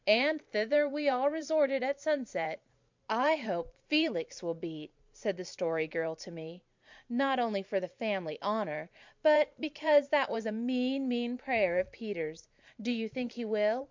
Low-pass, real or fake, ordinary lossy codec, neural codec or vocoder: 7.2 kHz; fake; MP3, 64 kbps; vocoder, 44.1 kHz, 128 mel bands every 512 samples, BigVGAN v2